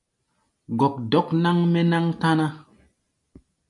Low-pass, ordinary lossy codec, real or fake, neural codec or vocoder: 10.8 kHz; MP3, 96 kbps; real; none